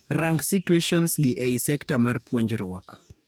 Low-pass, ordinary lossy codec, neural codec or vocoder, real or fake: none; none; codec, 44.1 kHz, 2.6 kbps, DAC; fake